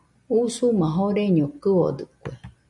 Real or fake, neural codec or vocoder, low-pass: real; none; 10.8 kHz